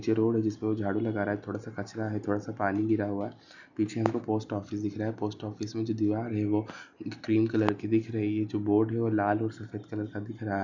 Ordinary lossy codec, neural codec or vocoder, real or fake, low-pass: none; none; real; 7.2 kHz